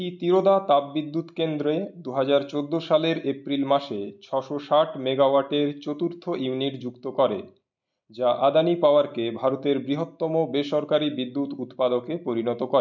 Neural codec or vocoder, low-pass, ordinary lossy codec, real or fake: none; 7.2 kHz; none; real